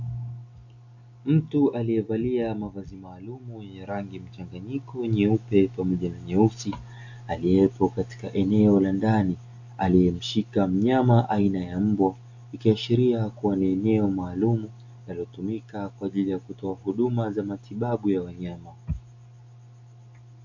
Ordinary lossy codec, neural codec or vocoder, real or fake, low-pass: AAC, 48 kbps; none; real; 7.2 kHz